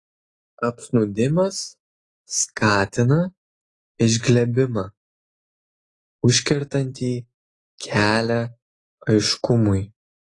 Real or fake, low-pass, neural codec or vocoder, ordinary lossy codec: real; 10.8 kHz; none; AAC, 32 kbps